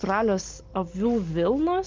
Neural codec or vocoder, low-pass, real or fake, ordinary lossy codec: none; 7.2 kHz; real; Opus, 24 kbps